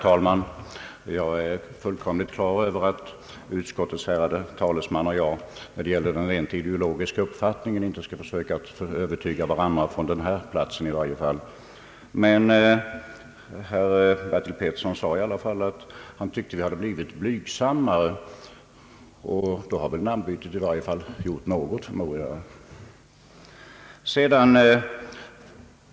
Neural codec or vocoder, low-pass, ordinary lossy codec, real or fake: none; none; none; real